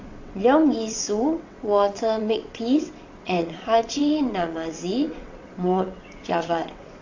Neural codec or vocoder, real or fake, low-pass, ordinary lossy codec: vocoder, 22.05 kHz, 80 mel bands, WaveNeXt; fake; 7.2 kHz; AAC, 48 kbps